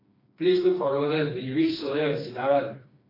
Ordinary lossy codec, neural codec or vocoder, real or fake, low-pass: AAC, 24 kbps; codec, 16 kHz, 2 kbps, FreqCodec, smaller model; fake; 5.4 kHz